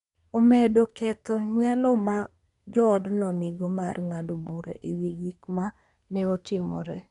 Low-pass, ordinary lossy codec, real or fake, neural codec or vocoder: 10.8 kHz; none; fake; codec, 24 kHz, 1 kbps, SNAC